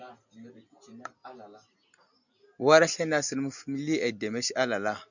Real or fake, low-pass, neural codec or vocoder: real; 7.2 kHz; none